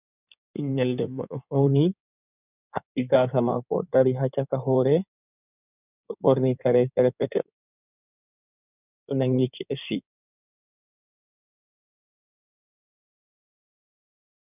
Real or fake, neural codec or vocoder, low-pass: fake; codec, 16 kHz in and 24 kHz out, 2.2 kbps, FireRedTTS-2 codec; 3.6 kHz